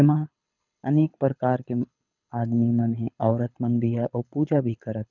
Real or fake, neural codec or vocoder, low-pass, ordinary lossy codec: fake; codec, 24 kHz, 6 kbps, HILCodec; 7.2 kHz; none